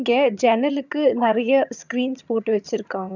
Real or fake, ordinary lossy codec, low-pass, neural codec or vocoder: fake; none; 7.2 kHz; vocoder, 22.05 kHz, 80 mel bands, HiFi-GAN